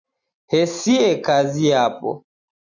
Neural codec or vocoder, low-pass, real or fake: none; 7.2 kHz; real